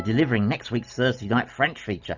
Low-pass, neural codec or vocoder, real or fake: 7.2 kHz; none; real